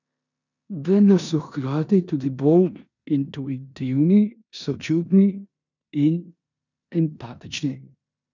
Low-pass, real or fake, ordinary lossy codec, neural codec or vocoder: 7.2 kHz; fake; none; codec, 16 kHz in and 24 kHz out, 0.9 kbps, LongCat-Audio-Codec, four codebook decoder